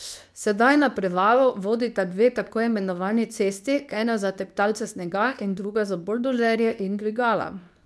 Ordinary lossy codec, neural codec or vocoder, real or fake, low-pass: none; codec, 24 kHz, 0.9 kbps, WavTokenizer, medium speech release version 2; fake; none